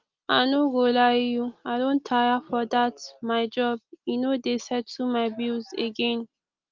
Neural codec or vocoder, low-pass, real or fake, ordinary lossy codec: none; 7.2 kHz; real; Opus, 32 kbps